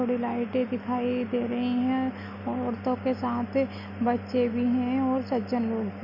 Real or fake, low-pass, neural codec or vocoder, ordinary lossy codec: real; 5.4 kHz; none; none